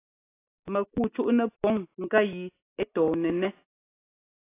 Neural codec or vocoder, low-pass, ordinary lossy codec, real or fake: none; 3.6 kHz; AAC, 24 kbps; real